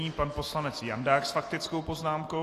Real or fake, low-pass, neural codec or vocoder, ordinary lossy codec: real; 14.4 kHz; none; AAC, 48 kbps